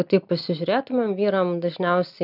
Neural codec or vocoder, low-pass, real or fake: none; 5.4 kHz; real